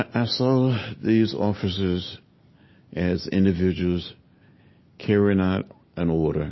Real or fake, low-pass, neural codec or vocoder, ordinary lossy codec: real; 7.2 kHz; none; MP3, 24 kbps